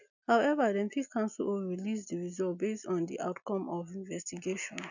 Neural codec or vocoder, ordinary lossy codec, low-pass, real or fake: none; none; 7.2 kHz; real